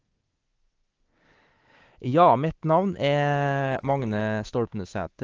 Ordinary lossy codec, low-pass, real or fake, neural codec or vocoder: Opus, 16 kbps; 7.2 kHz; fake; codec, 24 kHz, 3.1 kbps, DualCodec